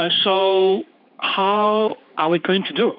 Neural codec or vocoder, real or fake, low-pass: codec, 16 kHz, 4 kbps, X-Codec, HuBERT features, trained on general audio; fake; 5.4 kHz